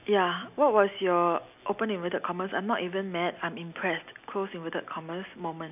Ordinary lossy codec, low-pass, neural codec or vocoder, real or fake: none; 3.6 kHz; none; real